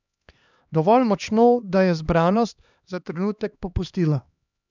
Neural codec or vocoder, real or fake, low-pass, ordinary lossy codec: codec, 16 kHz, 2 kbps, X-Codec, HuBERT features, trained on LibriSpeech; fake; 7.2 kHz; none